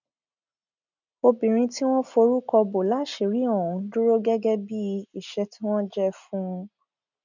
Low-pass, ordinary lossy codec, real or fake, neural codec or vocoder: 7.2 kHz; none; real; none